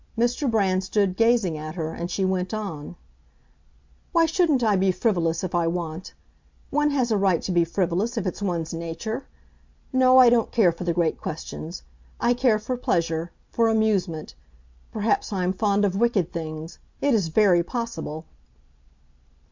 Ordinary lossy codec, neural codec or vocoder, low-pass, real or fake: MP3, 64 kbps; none; 7.2 kHz; real